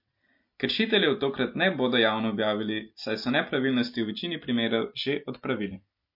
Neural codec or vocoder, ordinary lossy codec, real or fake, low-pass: none; MP3, 32 kbps; real; 5.4 kHz